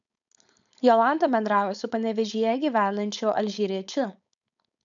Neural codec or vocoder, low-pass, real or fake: codec, 16 kHz, 4.8 kbps, FACodec; 7.2 kHz; fake